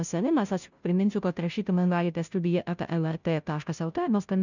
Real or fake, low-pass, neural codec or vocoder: fake; 7.2 kHz; codec, 16 kHz, 0.5 kbps, FunCodec, trained on Chinese and English, 25 frames a second